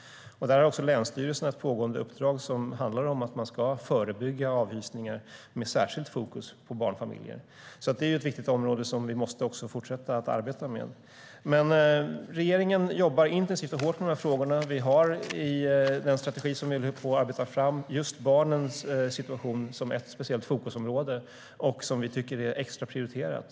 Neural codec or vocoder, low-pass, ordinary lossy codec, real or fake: none; none; none; real